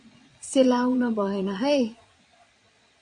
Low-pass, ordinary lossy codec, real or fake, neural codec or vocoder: 9.9 kHz; AAC, 48 kbps; fake; vocoder, 22.05 kHz, 80 mel bands, Vocos